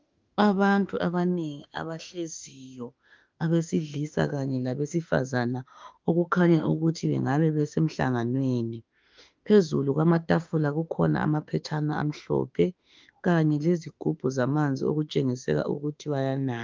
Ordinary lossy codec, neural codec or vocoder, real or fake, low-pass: Opus, 32 kbps; autoencoder, 48 kHz, 32 numbers a frame, DAC-VAE, trained on Japanese speech; fake; 7.2 kHz